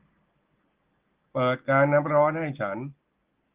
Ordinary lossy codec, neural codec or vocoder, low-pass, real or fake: Opus, 16 kbps; none; 3.6 kHz; real